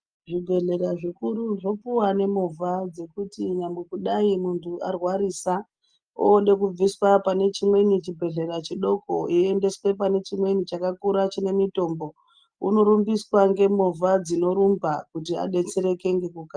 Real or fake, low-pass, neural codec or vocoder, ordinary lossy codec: real; 9.9 kHz; none; Opus, 32 kbps